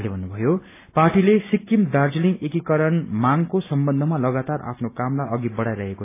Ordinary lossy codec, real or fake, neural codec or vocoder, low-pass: AAC, 24 kbps; real; none; 3.6 kHz